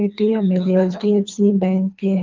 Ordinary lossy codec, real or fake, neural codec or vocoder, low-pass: Opus, 32 kbps; fake; codec, 24 kHz, 3 kbps, HILCodec; 7.2 kHz